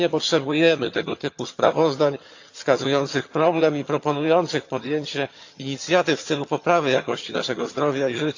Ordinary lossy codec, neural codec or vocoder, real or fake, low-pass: AAC, 48 kbps; vocoder, 22.05 kHz, 80 mel bands, HiFi-GAN; fake; 7.2 kHz